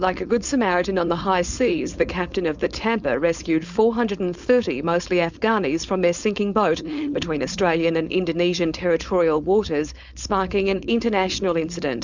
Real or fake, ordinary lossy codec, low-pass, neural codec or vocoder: fake; Opus, 64 kbps; 7.2 kHz; codec, 16 kHz, 4.8 kbps, FACodec